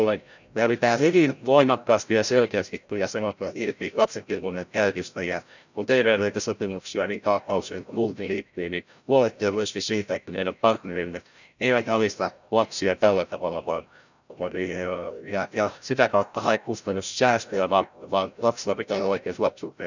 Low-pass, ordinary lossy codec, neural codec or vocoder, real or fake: 7.2 kHz; none; codec, 16 kHz, 0.5 kbps, FreqCodec, larger model; fake